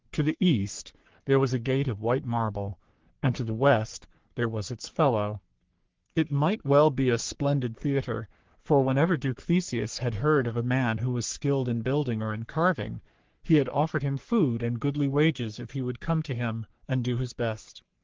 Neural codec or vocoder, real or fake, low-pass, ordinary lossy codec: codec, 44.1 kHz, 3.4 kbps, Pupu-Codec; fake; 7.2 kHz; Opus, 16 kbps